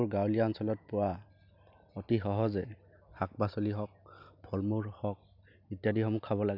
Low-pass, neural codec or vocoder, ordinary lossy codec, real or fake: 5.4 kHz; none; none; real